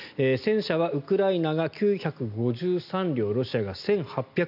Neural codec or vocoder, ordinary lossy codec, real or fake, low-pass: none; none; real; 5.4 kHz